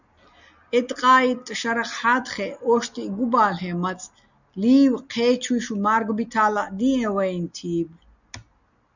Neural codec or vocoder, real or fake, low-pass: none; real; 7.2 kHz